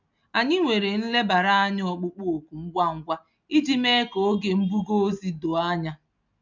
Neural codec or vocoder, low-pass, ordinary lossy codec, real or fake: none; 7.2 kHz; none; real